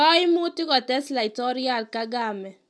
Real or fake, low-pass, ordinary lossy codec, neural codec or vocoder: real; none; none; none